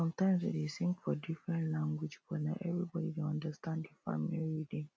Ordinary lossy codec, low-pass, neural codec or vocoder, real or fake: none; none; none; real